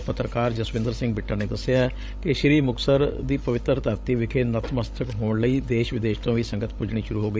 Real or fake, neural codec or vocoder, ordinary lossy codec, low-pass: fake; codec, 16 kHz, 16 kbps, FreqCodec, larger model; none; none